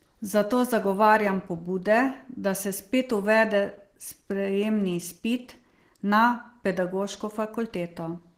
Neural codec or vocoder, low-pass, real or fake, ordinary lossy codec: none; 14.4 kHz; real; Opus, 16 kbps